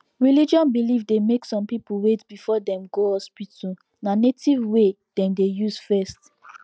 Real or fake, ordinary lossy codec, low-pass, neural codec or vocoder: real; none; none; none